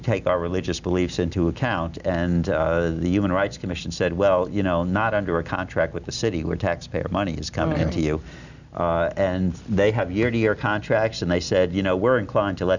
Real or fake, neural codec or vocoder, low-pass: real; none; 7.2 kHz